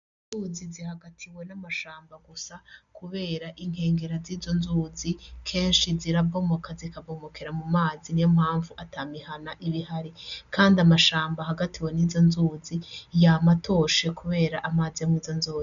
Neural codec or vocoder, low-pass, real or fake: none; 7.2 kHz; real